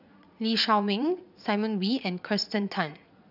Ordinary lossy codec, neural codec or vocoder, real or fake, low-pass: none; vocoder, 44.1 kHz, 80 mel bands, Vocos; fake; 5.4 kHz